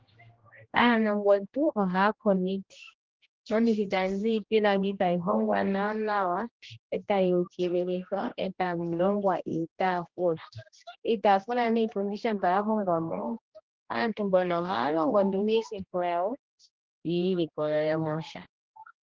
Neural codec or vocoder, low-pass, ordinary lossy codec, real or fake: codec, 16 kHz, 1 kbps, X-Codec, HuBERT features, trained on general audio; 7.2 kHz; Opus, 16 kbps; fake